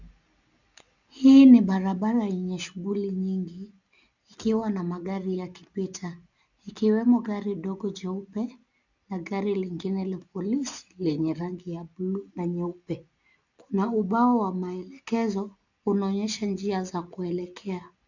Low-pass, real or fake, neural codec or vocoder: 7.2 kHz; real; none